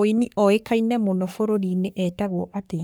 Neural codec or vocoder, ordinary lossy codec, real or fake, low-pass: codec, 44.1 kHz, 3.4 kbps, Pupu-Codec; none; fake; none